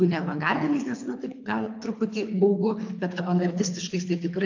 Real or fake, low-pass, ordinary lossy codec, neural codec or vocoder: fake; 7.2 kHz; AAC, 48 kbps; codec, 24 kHz, 3 kbps, HILCodec